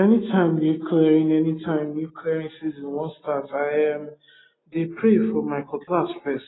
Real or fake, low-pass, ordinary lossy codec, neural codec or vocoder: real; 7.2 kHz; AAC, 16 kbps; none